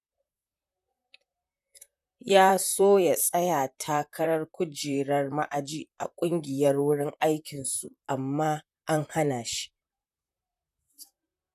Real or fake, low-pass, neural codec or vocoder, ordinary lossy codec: fake; 14.4 kHz; vocoder, 44.1 kHz, 128 mel bands, Pupu-Vocoder; none